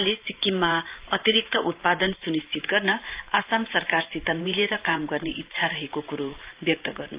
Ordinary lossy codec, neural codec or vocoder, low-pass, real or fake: Opus, 32 kbps; vocoder, 44.1 kHz, 128 mel bands every 512 samples, BigVGAN v2; 3.6 kHz; fake